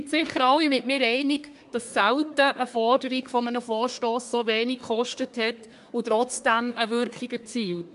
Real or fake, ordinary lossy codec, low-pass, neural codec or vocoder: fake; none; 10.8 kHz; codec, 24 kHz, 1 kbps, SNAC